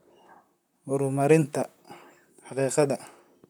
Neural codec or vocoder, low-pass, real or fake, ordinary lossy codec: vocoder, 44.1 kHz, 128 mel bands, Pupu-Vocoder; none; fake; none